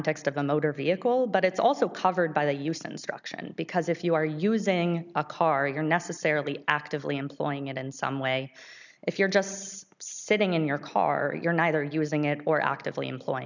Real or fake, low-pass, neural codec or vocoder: real; 7.2 kHz; none